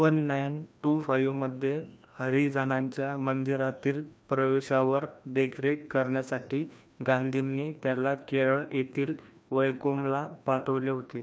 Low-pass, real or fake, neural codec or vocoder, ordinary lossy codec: none; fake; codec, 16 kHz, 1 kbps, FreqCodec, larger model; none